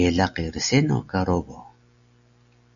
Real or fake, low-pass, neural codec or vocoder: real; 7.2 kHz; none